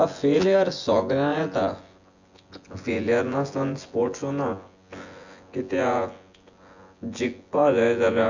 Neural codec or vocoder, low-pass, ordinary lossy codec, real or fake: vocoder, 24 kHz, 100 mel bands, Vocos; 7.2 kHz; Opus, 64 kbps; fake